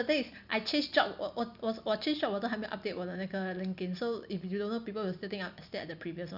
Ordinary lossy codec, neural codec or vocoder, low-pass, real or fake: none; none; 5.4 kHz; real